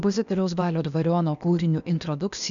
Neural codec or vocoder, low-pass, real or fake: codec, 16 kHz, 0.8 kbps, ZipCodec; 7.2 kHz; fake